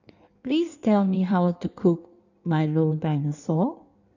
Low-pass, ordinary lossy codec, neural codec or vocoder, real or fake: 7.2 kHz; none; codec, 16 kHz in and 24 kHz out, 1.1 kbps, FireRedTTS-2 codec; fake